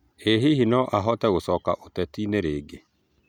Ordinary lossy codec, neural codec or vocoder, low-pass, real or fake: none; none; 19.8 kHz; real